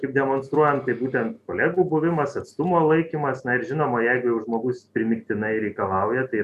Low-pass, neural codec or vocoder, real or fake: 14.4 kHz; none; real